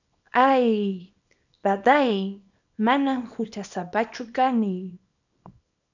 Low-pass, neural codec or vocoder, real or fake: 7.2 kHz; codec, 24 kHz, 0.9 kbps, WavTokenizer, small release; fake